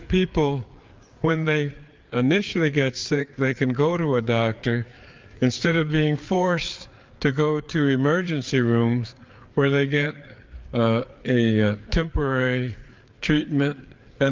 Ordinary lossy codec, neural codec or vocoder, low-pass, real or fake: Opus, 24 kbps; codec, 16 kHz, 4 kbps, FreqCodec, larger model; 7.2 kHz; fake